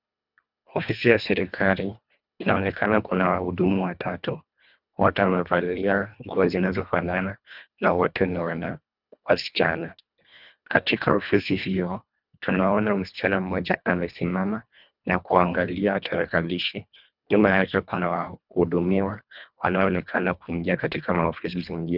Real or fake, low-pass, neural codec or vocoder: fake; 5.4 kHz; codec, 24 kHz, 1.5 kbps, HILCodec